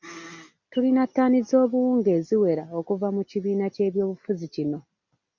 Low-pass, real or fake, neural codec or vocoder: 7.2 kHz; real; none